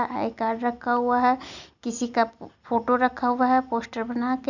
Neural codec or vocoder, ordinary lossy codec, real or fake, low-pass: none; none; real; 7.2 kHz